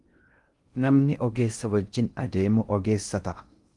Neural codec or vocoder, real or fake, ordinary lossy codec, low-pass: codec, 16 kHz in and 24 kHz out, 0.6 kbps, FocalCodec, streaming, 2048 codes; fake; Opus, 24 kbps; 10.8 kHz